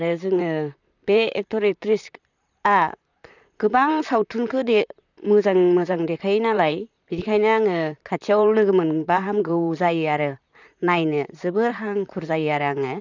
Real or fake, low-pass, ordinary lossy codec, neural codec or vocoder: fake; 7.2 kHz; none; vocoder, 44.1 kHz, 128 mel bands, Pupu-Vocoder